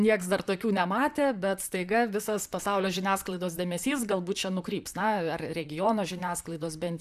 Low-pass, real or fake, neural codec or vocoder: 14.4 kHz; fake; vocoder, 44.1 kHz, 128 mel bands, Pupu-Vocoder